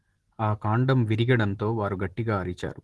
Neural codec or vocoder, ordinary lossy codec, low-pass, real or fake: none; Opus, 16 kbps; 10.8 kHz; real